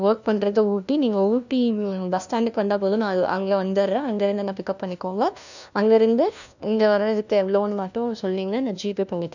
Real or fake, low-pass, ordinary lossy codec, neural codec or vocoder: fake; 7.2 kHz; none; codec, 16 kHz, 1 kbps, FunCodec, trained on LibriTTS, 50 frames a second